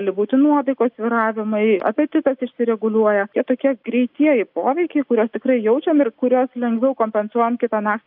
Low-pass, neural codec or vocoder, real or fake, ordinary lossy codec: 5.4 kHz; none; real; AAC, 48 kbps